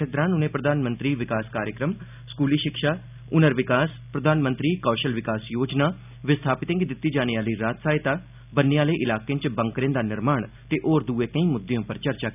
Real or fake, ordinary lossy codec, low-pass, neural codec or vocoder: real; none; 3.6 kHz; none